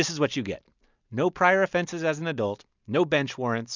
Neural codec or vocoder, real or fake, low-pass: none; real; 7.2 kHz